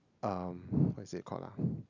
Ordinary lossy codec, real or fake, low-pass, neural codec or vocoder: none; real; 7.2 kHz; none